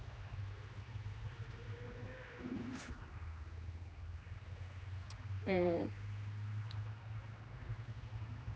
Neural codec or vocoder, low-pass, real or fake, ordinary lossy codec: codec, 16 kHz, 2 kbps, X-Codec, HuBERT features, trained on general audio; none; fake; none